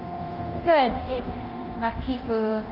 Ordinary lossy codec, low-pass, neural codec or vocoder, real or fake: Opus, 24 kbps; 5.4 kHz; codec, 24 kHz, 0.9 kbps, DualCodec; fake